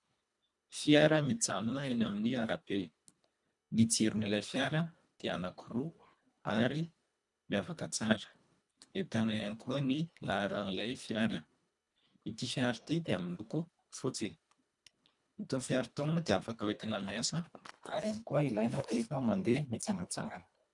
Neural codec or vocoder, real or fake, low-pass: codec, 24 kHz, 1.5 kbps, HILCodec; fake; 10.8 kHz